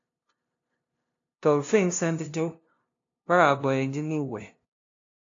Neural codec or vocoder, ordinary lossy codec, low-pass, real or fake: codec, 16 kHz, 0.5 kbps, FunCodec, trained on LibriTTS, 25 frames a second; AAC, 48 kbps; 7.2 kHz; fake